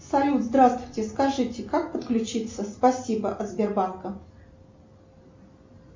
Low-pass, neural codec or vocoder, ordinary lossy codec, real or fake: 7.2 kHz; none; MP3, 64 kbps; real